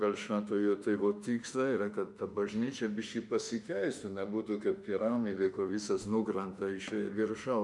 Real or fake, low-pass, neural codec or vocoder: fake; 10.8 kHz; autoencoder, 48 kHz, 32 numbers a frame, DAC-VAE, trained on Japanese speech